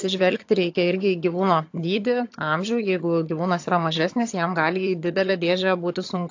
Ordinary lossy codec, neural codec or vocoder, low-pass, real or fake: AAC, 48 kbps; vocoder, 22.05 kHz, 80 mel bands, HiFi-GAN; 7.2 kHz; fake